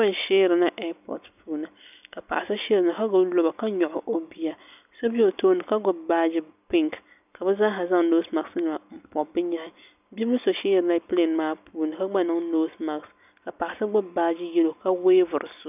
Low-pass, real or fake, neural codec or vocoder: 3.6 kHz; real; none